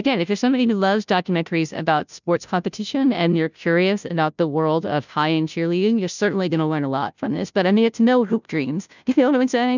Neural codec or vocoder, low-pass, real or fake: codec, 16 kHz, 0.5 kbps, FunCodec, trained on Chinese and English, 25 frames a second; 7.2 kHz; fake